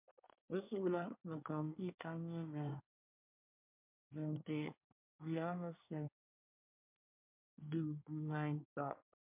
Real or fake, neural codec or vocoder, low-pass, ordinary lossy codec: fake; codec, 24 kHz, 1 kbps, SNAC; 3.6 kHz; MP3, 32 kbps